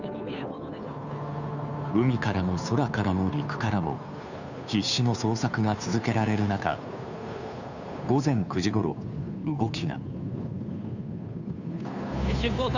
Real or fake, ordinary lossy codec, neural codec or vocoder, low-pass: fake; none; codec, 16 kHz, 2 kbps, FunCodec, trained on Chinese and English, 25 frames a second; 7.2 kHz